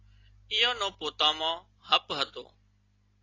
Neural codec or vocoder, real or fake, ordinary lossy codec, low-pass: none; real; AAC, 32 kbps; 7.2 kHz